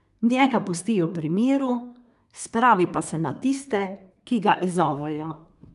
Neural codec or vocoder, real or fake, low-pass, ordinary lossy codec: codec, 24 kHz, 1 kbps, SNAC; fake; 10.8 kHz; none